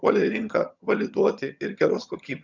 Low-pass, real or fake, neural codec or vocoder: 7.2 kHz; fake; vocoder, 22.05 kHz, 80 mel bands, HiFi-GAN